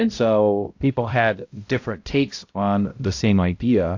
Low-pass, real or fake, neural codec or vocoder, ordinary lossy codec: 7.2 kHz; fake; codec, 16 kHz, 0.5 kbps, X-Codec, HuBERT features, trained on balanced general audio; AAC, 48 kbps